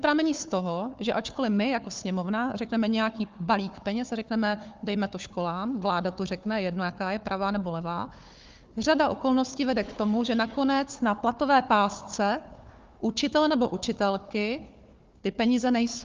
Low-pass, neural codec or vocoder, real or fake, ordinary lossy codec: 7.2 kHz; codec, 16 kHz, 4 kbps, FunCodec, trained on Chinese and English, 50 frames a second; fake; Opus, 24 kbps